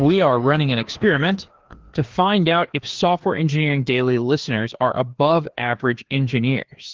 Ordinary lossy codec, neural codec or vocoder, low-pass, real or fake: Opus, 16 kbps; codec, 16 kHz, 2 kbps, FreqCodec, larger model; 7.2 kHz; fake